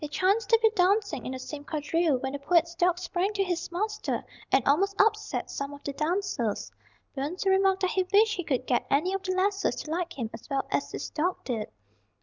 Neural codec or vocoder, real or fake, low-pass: none; real; 7.2 kHz